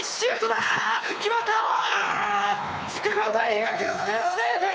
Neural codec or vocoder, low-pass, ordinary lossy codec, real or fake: codec, 16 kHz, 2 kbps, X-Codec, WavLM features, trained on Multilingual LibriSpeech; none; none; fake